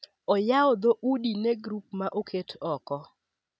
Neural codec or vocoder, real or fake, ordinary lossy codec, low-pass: none; real; none; none